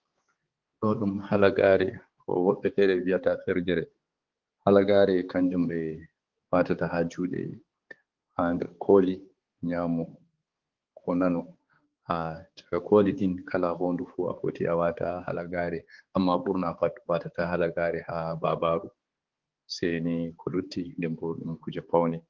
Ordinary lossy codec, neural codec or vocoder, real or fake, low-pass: Opus, 16 kbps; codec, 16 kHz, 4 kbps, X-Codec, HuBERT features, trained on balanced general audio; fake; 7.2 kHz